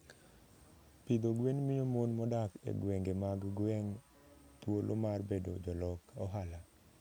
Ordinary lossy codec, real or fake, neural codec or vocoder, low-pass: none; real; none; none